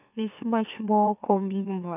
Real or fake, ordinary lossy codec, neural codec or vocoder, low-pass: fake; none; autoencoder, 44.1 kHz, a latent of 192 numbers a frame, MeloTTS; 3.6 kHz